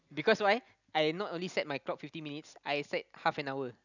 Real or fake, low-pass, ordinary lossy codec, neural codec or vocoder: real; 7.2 kHz; none; none